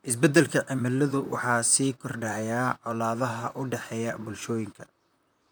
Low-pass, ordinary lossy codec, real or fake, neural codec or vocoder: none; none; fake; vocoder, 44.1 kHz, 128 mel bands, Pupu-Vocoder